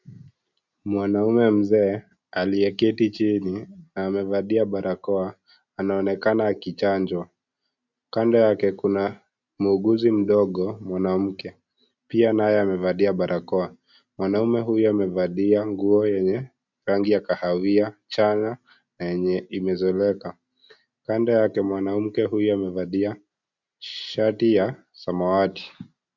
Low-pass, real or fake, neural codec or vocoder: 7.2 kHz; real; none